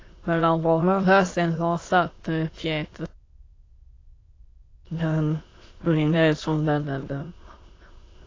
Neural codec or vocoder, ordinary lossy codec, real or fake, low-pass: autoencoder, 22.05 kHz, a latent of 192 numbers a frame, VITS, trained on many speakers; AAC, 32 kbps; fake; 7.2 kHz